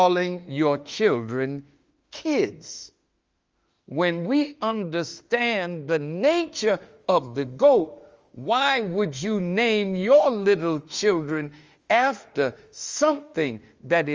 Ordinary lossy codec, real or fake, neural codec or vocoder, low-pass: Opus, 32 kbps; fake; autoencoder, 48 kHz, 32 numbers a frame, DAC-VAE, trained on Japanese speech; 7.2 kHz